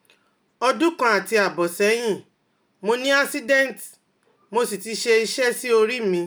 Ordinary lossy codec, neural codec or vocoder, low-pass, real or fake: none; none; none; real